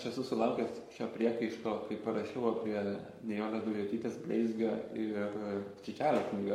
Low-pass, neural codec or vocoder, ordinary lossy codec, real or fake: 19.8 kHz; codec, 44.1 kHz, 7.8 kbps, Pupu-Codec; MP3, 64 kbps; fake